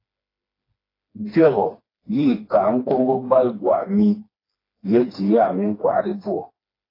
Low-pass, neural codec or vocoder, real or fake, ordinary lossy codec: 5.4 kHz; codec, 16 kHz, 2 kbps, FreqCodec, smaller model; fake; AAC, 24 kbps